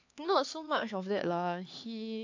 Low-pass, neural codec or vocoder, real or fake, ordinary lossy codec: 7.2 kHz; codec, 16 kHz, 4 kbps, X-Codec, HuBERT features, trained on LibriSpeech; fake; AAC, 48 kbps